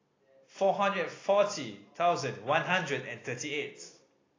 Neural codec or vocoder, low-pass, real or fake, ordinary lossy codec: none; 7.2 kHz; real; AAC, 32 kbps